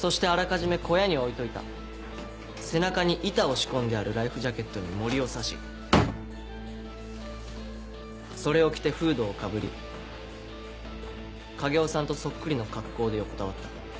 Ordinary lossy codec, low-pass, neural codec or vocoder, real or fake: none; none; none; real